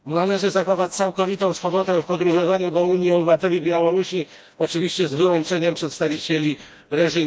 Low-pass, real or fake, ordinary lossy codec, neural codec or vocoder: none; fake; none; codec, 16 kHz, 1 kbps, FreqCodec, smaller model